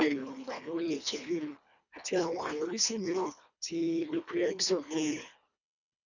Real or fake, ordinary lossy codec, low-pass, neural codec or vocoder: fake; none; 7.2 kHz; codec, 24 kHz, 1.5 kbps, HILCodec